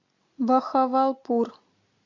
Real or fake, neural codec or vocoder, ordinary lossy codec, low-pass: real; none; MP3, 48 kbps; 7.2 kHz